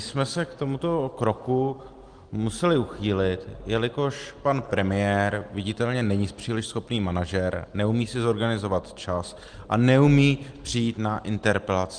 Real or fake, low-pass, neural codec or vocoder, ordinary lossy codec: real; 9.9 kHz; none; Opus, 24 kbps